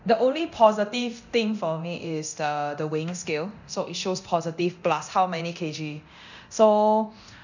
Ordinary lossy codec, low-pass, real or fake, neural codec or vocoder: none; 7.2 kHz; fake; codec, 24 kHz, 0.9 kbps, DualCodec